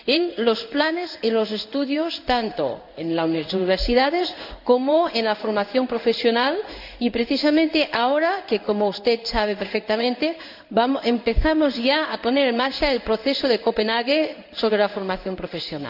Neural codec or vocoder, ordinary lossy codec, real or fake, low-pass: codec, 16 kHz in and 24 kHz out, 1 kbps, XY-Tokenizer; none; fake; 5.4 kHz